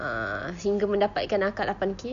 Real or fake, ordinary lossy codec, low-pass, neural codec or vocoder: real; none; 7.2 kHz; none